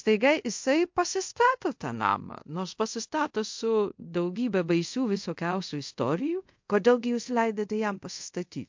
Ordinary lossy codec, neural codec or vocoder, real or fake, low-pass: MP3, 48 kbps; codec, 24 kHz, 0.5 kbps, DualCodec; fake; 7.2 kHz